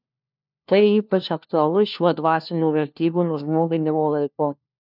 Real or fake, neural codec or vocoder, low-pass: fake; codec, 16 kHz, 1 kbps, FunCodec, trained on LibriTTS, 50 frames a second; 5.4 kHz